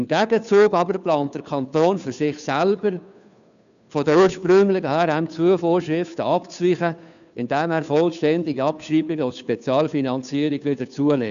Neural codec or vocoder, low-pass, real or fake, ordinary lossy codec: codec, 16 kHz, 2 kbps, FunCodec, trained on Chinese and English, 25 frames a second; 7.2 kHz; fake; none